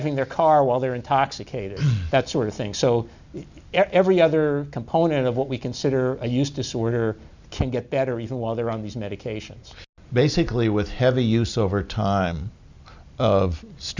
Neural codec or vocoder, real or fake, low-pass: none; real; 7.2 kHz